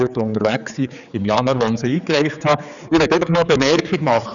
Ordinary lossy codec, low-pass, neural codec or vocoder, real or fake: none; 7.2 kHz; codec, 16 kHz, 4 kbps, X-Codec, HuBERT features, trained on general audio; fake